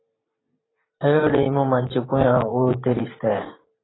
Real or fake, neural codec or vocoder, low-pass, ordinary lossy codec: fake; vocoder, 44.1 kHz, 128 mel bands every 512 samples, BigVGAN v2; 7.2 kHz; AAC, 16 kbps